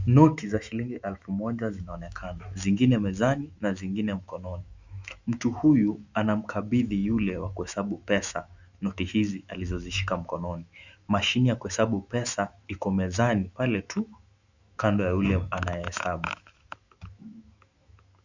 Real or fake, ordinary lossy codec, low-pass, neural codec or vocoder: fake; Opus, 64 kbps; 7.2 kHz; autoencoder, 48 kHz, 128 numbers a frame, DAC-VAE, trained on Japanese speech